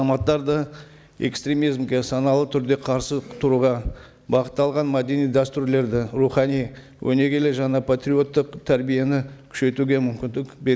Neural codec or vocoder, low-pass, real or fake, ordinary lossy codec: none; none; real; none